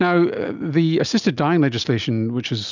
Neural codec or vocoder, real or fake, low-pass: none; real; 7.2 kHz